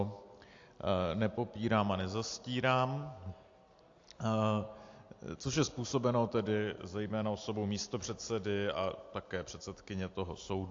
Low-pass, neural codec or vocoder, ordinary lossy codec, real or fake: 7.2 kHz; none; AAC, 48 kbps; real